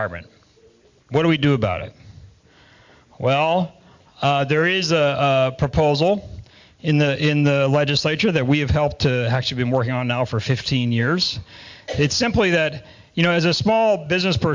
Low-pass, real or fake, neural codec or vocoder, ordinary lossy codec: 7.2 kHz; real; none; MP3, 64 kbps